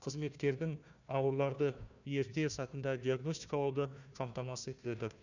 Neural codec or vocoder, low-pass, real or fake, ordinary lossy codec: codec, 16 kHz, 1 kbps, FunCodec, trained on Chinese and English, 50 frames a second; 7.2 kHz; fake; none